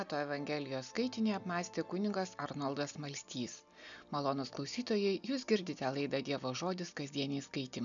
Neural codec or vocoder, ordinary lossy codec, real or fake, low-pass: none; MP3, 96 kbps; real; 7.2 kHz